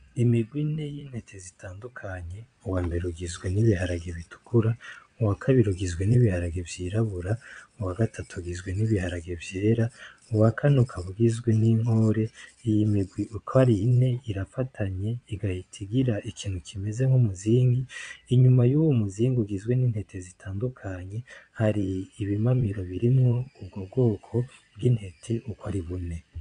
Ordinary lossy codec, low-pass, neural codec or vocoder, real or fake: MP3, 64 kbps; 9.9 kHz; vocoder, 22.05 kHz, 80 mel bands, Vocos; fake